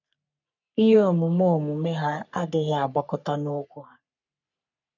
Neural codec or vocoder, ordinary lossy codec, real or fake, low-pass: codec, 44.1 kHz, 3.4 kbps, Pupu-Codec; none; fake; 7.2 kHz